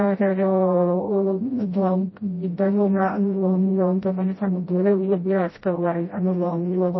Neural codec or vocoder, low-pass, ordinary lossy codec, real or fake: codec, 16 kHz, 0.5 kbps, FreqCodec, smaller model; 7.2 kHz; MP3, 24 kbps; fake